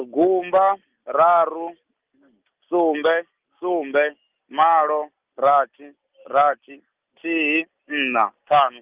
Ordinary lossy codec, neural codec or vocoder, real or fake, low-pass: Opus, 24 kbps; none; real; 3.6 kHz